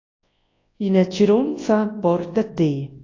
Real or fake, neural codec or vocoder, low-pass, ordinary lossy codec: fake; codec, 24 kHz, 0.9 kbps, WavTokenizer, large speech release; 7.2 kHz; AAC, 32 kbps